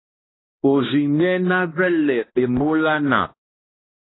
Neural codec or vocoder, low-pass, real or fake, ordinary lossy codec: codec, 16 kHz, 1 kbps, X-Codec, HuBERT features, trained on balanced general audio; 7.2 kHz; fake; AAC, 16 kbps